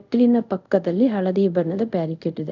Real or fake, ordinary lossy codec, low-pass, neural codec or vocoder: fake; none; 7.2 kHz; codec, 24 kHz, 0.5 kbps, DualCodec